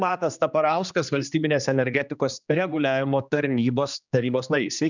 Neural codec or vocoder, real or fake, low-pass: codec, 16 kHz, 2 kbps, X-Codec, HuBERT features, trained on general audio; fake; 7.2 kHz